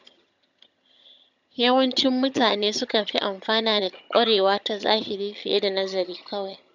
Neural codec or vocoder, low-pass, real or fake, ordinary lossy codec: vocoder, 22.05 kHz, 80 mel bands, HiFi-GAN; 7.2 kHz; fake; none